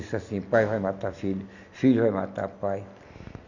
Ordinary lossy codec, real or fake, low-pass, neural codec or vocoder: none; real; 7.2 kHz; none